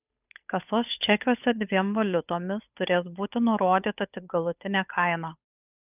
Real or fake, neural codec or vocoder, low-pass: fake; codec, 16 kHz, 8 kbps, FunCodec, trained on Chinese and English, 25 frames a second; 3.6 kHz